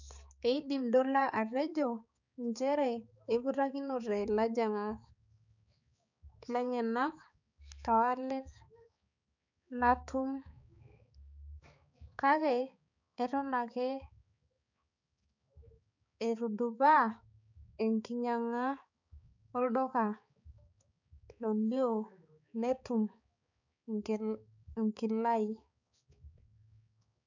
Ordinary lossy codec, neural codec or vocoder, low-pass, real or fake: none; codec, 16 kHz, 4 kbps, X-Codec, HuBERT features, trained on balanced general audio; 7.2 kHz; fake